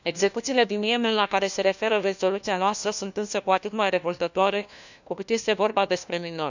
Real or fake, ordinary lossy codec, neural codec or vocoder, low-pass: fake; none; codec, 16 kHz, 1 kbps, FunCodec, trained on LibriTTS, 50 frames a second; 7.2 kHz